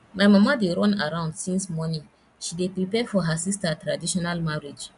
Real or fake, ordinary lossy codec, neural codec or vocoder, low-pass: real; none; none; 10.8 kHz